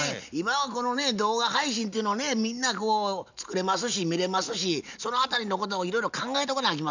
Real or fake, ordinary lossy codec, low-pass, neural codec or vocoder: real; none; 7.2 kHz; none